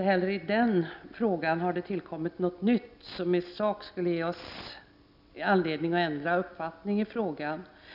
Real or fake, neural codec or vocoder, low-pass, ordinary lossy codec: real; none; 5.4 kHz; none